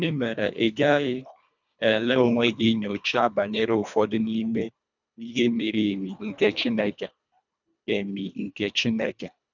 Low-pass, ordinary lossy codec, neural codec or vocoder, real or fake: 7.2 kHz; none; codec, 24 kHz, 1.5 kbps, HILCodec; fake